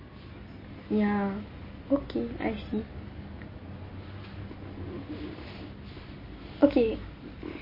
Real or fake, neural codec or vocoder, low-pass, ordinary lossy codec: real; none; 5.4 kHz; MP3, 24 kbps